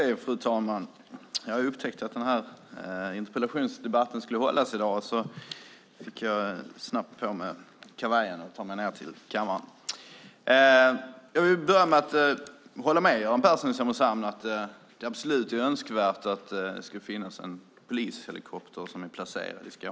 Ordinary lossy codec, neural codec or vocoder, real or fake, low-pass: none; none; real; none